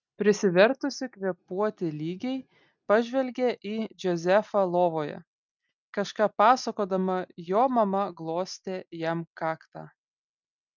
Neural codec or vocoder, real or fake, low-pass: none; real; 7.2 kHz